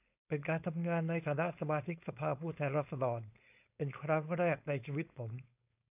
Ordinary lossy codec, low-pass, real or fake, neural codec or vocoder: AAC, 32 kbps; 3.6 kHz; fake; codec, 16 kHz, 4.8 kbps, FACodec